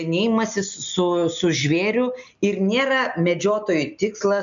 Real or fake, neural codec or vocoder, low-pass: real; none; 7.2 kHz